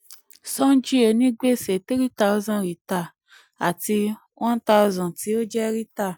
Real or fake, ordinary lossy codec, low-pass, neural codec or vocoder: real; none; none; none